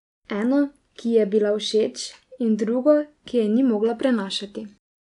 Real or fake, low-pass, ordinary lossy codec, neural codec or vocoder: real; 10.8 kHz; none; none